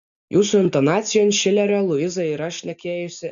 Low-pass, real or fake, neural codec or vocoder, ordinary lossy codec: 7.2 kHz; real; none; MP3, 96 kbps